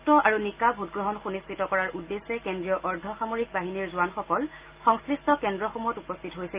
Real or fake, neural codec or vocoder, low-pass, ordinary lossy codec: real; none; 3.6 kHz; Opus, 32 kbps